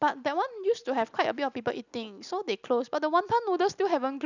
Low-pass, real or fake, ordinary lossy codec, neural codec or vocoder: 7.2 kHz; real; none; none